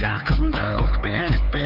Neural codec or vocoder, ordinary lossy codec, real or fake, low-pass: codec, 16 kHz, 4 kbps, X-Codec, HuBERT features, trained on LibriSpeech; none; fake; 5.4 kHz